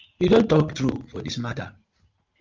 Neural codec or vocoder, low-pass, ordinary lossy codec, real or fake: vocoder, 44.1 kHz, 80 mel bands, Vocos; 7.2 kHz; Opus, 24 kbps; fake